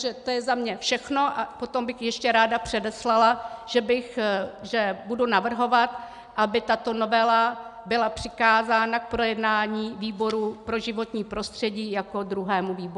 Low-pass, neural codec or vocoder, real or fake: 10.8 kHz; none; real